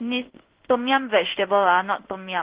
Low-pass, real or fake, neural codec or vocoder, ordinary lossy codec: 3.6 kHz; fake; codec, 16 kHz in and 24 kHz out, 1 kbps, XY-Tokenizer; Opus, 16 kbps